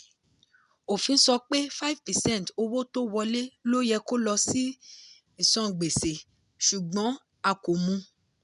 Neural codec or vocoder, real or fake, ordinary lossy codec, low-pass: none; real; none; 10.8 kHz